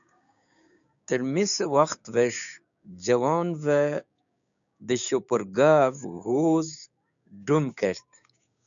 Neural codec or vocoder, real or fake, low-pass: codec, 16 kHz, 6 kbps, DAC; fake; 7.2 kHz